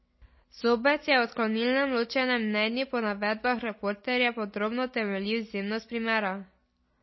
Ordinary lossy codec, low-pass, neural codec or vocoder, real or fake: MP3, 24 kbps; 7.2 kHz; none; real